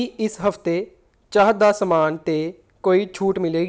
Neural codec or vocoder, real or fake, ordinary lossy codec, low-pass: none; real; none; none